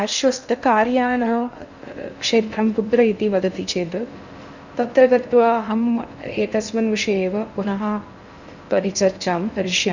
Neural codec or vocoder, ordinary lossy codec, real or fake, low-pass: codec, 16 kHz in and 24 kHz out, 0.6 kbps, FocalCodec, streaming, 2048 codes; none; fake; 7.2 kHz